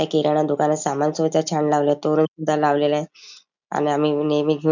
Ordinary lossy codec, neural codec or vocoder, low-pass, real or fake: none; none; 7.2 kHz; real